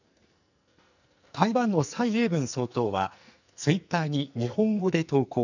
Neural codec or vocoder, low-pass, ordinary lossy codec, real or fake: codec, 44.1 kHz, 2.6 kbps, SNAC; 7.2 kHz; none; fake